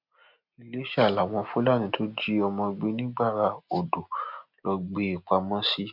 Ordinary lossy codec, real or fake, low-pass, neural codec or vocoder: MP3, 48 kbps; real; 5.4 kHz; none